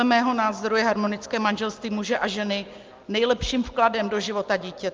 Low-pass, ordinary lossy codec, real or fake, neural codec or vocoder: 7.2 kHz; Opus, 32 kbps; real; none